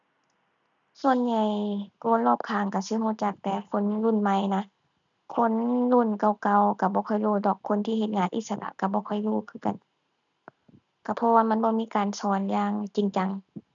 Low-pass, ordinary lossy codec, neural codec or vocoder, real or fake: 7.2 kHz; none; none; real